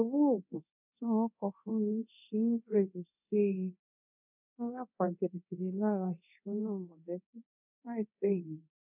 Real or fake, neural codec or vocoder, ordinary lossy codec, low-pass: fake; codec, 24 kHz, 0.9 kbps, DualCodec; none; 3.6 kHz